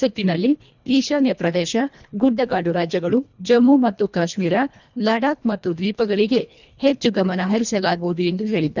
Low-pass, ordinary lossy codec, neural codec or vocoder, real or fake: 7.2 kHz; none; codec, 24 kHz, 1.5 kbps, HILCodec; fake